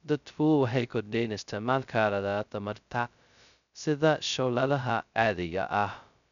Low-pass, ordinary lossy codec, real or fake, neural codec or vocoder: 7.2 kHz; none; fake; codec, 16 kHz, 0.2 kbps, FocalCodec